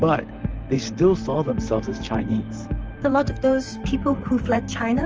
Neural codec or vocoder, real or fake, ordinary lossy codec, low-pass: vocoder, 44.1 kHz, 128 mel bands, Pupu-Vocoder; fake; Opus, 24 kbps; 7.2 kHz